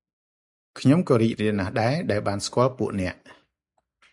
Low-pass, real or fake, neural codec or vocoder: 10.8 kHz; real; none